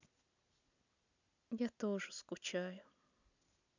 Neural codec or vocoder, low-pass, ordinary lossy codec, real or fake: none; 7.2 kHz; none; real